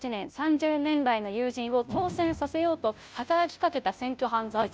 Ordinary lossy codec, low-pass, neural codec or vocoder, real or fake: none; none; codec, 16 kHz, 0.5 kbps, FunCodec, trained on Chinese and English, 25 frames a second; fake